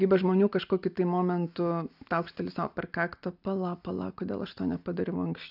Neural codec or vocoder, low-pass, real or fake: none; 5.4 kHz; real